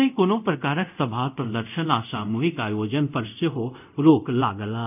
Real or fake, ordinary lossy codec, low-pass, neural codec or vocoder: fake; none; 3.6 kHz; codec, 24 kHz, 0.5 kbps, DualCodec